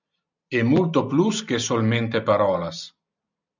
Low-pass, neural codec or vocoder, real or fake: 7.2 kHz; none; real